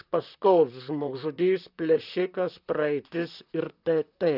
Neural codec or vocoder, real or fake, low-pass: vocoder, 44.1 kHz, 128 mel bands, Pupu-Vocoder; fake; 5.4 kHz